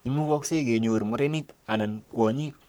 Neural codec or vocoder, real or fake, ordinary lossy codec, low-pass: codec, 44.1 kHz, 3.4 kbps, Pupu-Codec; fake; none; none